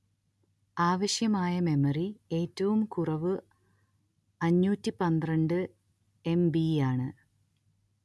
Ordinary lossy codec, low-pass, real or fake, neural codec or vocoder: none; none; real; none